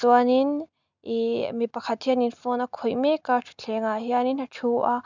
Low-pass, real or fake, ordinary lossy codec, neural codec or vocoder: 7.2 kHz; real; none; none